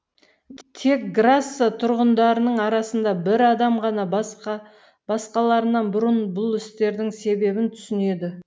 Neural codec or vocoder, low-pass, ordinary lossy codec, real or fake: none; none; none; real